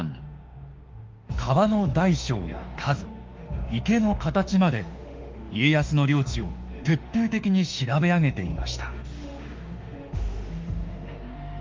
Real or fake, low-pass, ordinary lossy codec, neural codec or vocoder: fake; 7.2 kHz; Opus, 32 kbps; autoencoder, 48 kHz, 32 numbers a frame, DAC-VAE, trained on Japanese speech